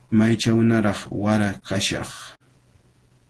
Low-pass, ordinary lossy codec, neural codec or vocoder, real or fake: 10.8 kHz; Opus, 16 kbps; vocoder, 48 kHz, 128 mel bands, Vocos; fake